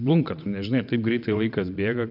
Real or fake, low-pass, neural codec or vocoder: fake; 5.4 kHz; vocoder, 22.05 kHz, 80 mel bands, WaveNeXt